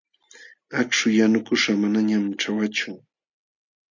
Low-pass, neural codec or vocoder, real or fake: 7.2 kHz; none; real